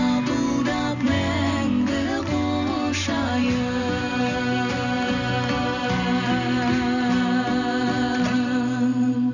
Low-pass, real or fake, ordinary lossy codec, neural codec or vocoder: 7.2 kHz; real; none; none